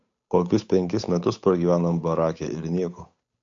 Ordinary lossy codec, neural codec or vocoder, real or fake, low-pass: MP3, 48 kbps; codec, 16 kHz, 8 kbps, FunCodec, trained on Chinese and English, 25 frames a second; fake; 7.2 kHz